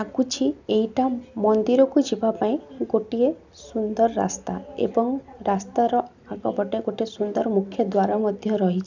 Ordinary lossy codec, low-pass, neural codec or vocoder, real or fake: none; 7.2 kHz; none; real